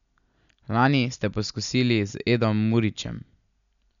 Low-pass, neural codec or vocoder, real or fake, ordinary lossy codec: 7.2 kHz; none; real; none